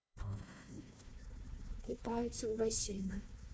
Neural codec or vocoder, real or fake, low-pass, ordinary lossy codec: codec, 16 kHz, 1 kbps, FunCodec, trained on Chinese and English, 50 frames a second; fake; none; none